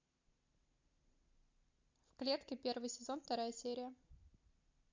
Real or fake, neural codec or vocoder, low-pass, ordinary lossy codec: real; none; 7.2 kHz; MP3, 48 kbps